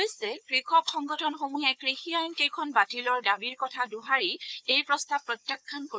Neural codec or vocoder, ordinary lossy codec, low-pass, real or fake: codec, 16 kHz, 4 kbps, FunCodec, trained on Chinese and English, 50 frames a second; none; none; fake